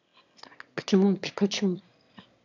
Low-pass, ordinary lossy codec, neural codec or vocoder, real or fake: 7.2 kHz; none; autoencoder, 22.05 kHz, a latent of 192 numbers a frame, VITS, trained on one speaker; fake